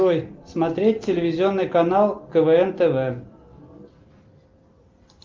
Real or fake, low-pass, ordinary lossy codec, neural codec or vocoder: real; 7.2 kHz; Opus, 24 kbps; none